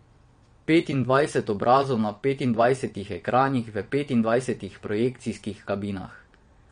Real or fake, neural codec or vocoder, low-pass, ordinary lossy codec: fake; vocoder, 22.05 kHz, 80 mel bands, WaveNeXt; 9.9 kHz; MP3, 48 kbps